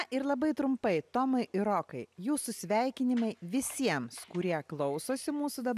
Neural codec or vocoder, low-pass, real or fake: none; 14.4 kHz; real